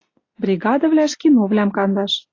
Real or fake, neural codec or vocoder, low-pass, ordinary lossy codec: real; none; 7.2 kHz; AAC, 32 kbps